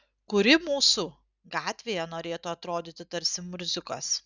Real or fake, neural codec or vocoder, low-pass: real; none; 7.2 kHz